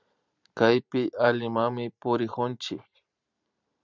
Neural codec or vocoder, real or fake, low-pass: vocoder, 44.1 kHz, 128 mel bands every 512 samples, BigVGAN v2; fake; 7.2 kHz